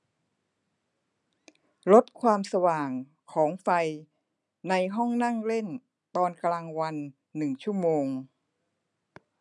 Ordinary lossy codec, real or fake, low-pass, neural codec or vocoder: none; real; 10.8 kHz; none